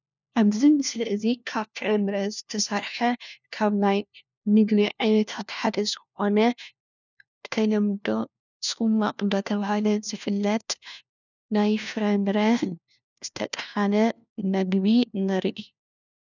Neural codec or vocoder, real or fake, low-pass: codec, 16 kHz, 1 kbps, FunCodec, trained on LibriTTS, 50 frames a second; fake; 7.2 kHz